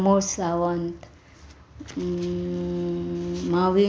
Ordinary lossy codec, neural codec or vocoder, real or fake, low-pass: none; none; real; none